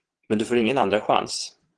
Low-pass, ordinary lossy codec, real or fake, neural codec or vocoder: 9.9 kHz; Opus, 16 kbps; fake; vocoder, 22.05 kHz, 80 mel bands, WaveNeXt